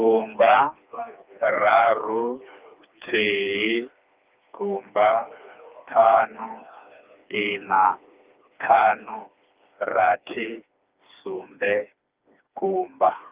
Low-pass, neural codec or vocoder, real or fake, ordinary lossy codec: 3.6 kHz; codec, 16 kHz, 2 kbps, FreqCodec, smaller model; fake; Opus, 24 kbps